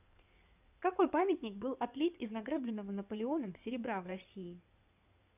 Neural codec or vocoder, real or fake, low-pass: codec, 16 kHz in and 24 kHz out, 2.2 kbps, FireRedTTS-2 codec; fake; 3.6 kHz